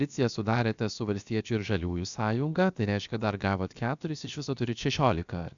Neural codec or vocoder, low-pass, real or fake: codec, 16 kHz, about 1 kbps, DyCAST, with the encoder's durations; 7.2 kHz; fake